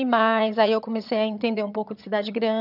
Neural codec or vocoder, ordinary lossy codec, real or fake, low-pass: vocoder, 22.05 kHz, 80 mel bands, HiFi-GAN; none; fake; 5.4 kHz